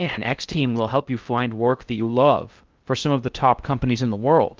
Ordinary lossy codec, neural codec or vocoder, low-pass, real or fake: Opus, 24 kbps; codec, 16 kHz in and 24 kHz out, 0.6 kbps, FocalCodec, streaming, 2048 codes; 7.2 kHz; fake